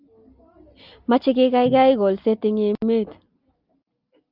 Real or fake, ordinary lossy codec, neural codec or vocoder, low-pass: real; Opus, 24 kbps; none; 5.4 kHz